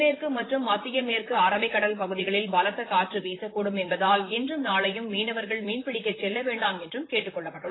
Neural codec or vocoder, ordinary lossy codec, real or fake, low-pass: vocoder, 44.1 kHz, 128 mel bands, Pupu-Vocoder; AAC, 16 kbps; fake; 7.2 kHz